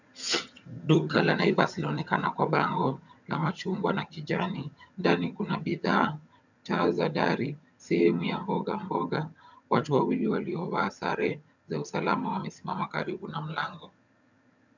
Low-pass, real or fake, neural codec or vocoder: 7.2 kHz; fake; vocoder, 22.05 kHz, 80 mel bands, HiFi-GAN